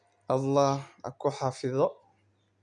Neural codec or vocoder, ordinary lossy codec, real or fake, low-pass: none; none; real; 9.9 kHz